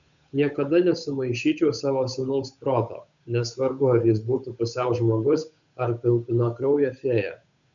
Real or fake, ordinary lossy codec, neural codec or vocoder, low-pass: fake; MP3, 96 kbps; codec, 16 kHz, 8 kbps, FunCodec, trained on Chinese and English, 25 frames a second; 7.2 kHz